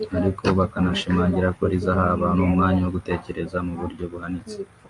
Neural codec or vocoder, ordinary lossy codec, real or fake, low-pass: none; MP3, 48 kbps; real; 10.8 kHz